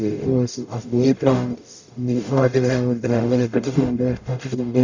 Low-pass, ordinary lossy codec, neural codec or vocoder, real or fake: 7.2 kHz; Opus, 64 kbps; codec, 44.1 kHz, 0.9 kbps, DAC; fake